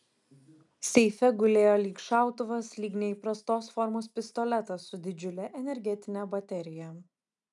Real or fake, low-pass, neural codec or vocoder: real; 10.8 kHz; none